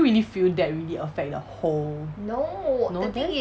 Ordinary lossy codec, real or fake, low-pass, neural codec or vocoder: none; real; none; none